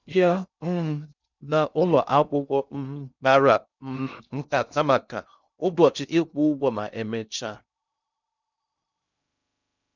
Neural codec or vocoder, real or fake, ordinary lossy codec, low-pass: codec, 16 kHz in and 24 kHz out, 0.6 kbps, FocalCodec, streaming, 2048 codes; fake; none; 7.2 kHz